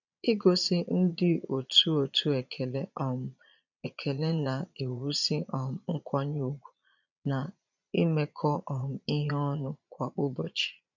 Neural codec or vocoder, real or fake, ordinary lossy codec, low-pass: vocoder, 22.05 kHz, 80 mel bands, Vocos; fake; none; 7.2 kHz